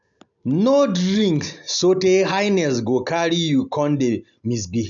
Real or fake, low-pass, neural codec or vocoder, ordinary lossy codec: real; 7.2 kHz; none; none